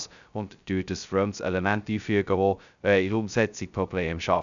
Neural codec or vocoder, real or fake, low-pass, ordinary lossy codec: codec, 16 kHz, 0.2 kbps, FocalCodec; fake; 7.2 kHz; none